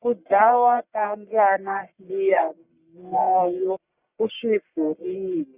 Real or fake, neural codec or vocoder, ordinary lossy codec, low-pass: fake; codec, 44.1 kHz, 1.7 kbps, Pupu-Codec; Opus, 64 kbps; 3.6 kHz